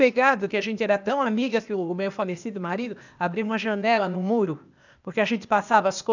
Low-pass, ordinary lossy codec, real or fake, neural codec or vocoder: 7.2 kHz; none; fake; codec, 16 kHz, 0.8 kbps, ZipCodec